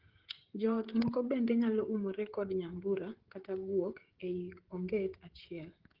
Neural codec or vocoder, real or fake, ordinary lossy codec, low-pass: codec, 16 kHz, 16 kbps, FreqCodec, smaller model; fake; Opus, 16 kbps; 5.4 kHz